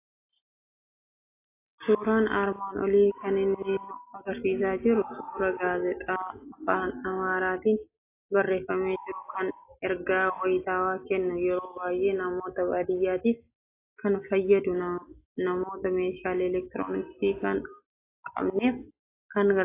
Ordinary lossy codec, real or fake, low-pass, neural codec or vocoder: AAC, 24 kbps; real; 3.6 kHz; none